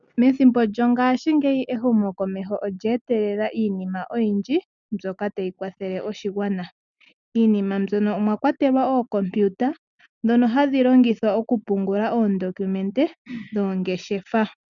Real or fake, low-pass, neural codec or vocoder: real; 7.2 kHz; none